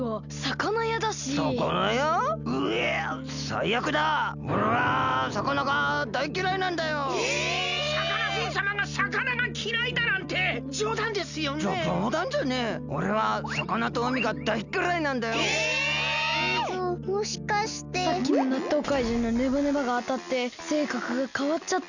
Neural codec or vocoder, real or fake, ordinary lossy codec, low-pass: none; real; none; 7.2 kHz